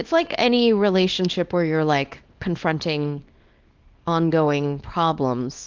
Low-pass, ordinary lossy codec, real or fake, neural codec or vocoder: 7.2 kHz; Opus, 32 kbps; fake; codec, 24 kHz, 0.9 kbps, WavTokenizer, small release